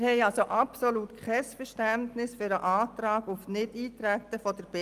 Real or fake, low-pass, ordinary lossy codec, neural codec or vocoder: real; 14.4 kHz; Opus, 32 kbps; none